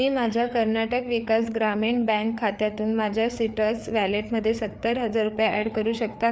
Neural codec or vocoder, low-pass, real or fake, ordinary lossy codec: codec, 16 kHz, 4 kbps, FreqCodec, larger model; none; fake; none